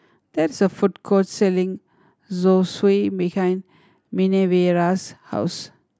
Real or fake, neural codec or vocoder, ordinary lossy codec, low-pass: real; none; none; none